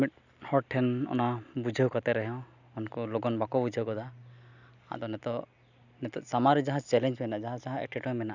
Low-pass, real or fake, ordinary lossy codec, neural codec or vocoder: 7.2 kHz; real; none; none